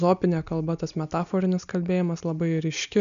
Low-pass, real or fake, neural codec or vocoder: 7.2 kHz; real; none